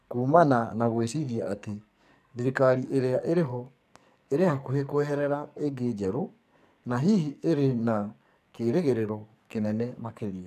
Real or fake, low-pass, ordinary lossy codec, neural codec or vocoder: fake; 14.4 kHz; none; codec, 44.1 kHz, 2.6 kbps, SNAC